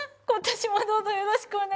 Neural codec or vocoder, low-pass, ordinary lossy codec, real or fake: none; none; none; real